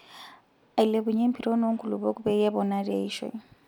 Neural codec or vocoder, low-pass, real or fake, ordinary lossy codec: none; 19.8 kHz; real; none